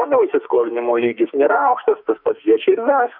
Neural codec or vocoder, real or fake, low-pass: codec, 44.1 kHz, 2.6 kbps, SNAC; fake; 5.4 kHz